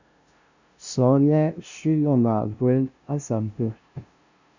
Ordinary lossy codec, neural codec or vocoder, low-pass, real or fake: Opus, 64 kbps; codec, 16 kHz, 0.5 kbps, FunCodec, trained on LibriTTS, 25 frames a second; 7.2 kHz; fake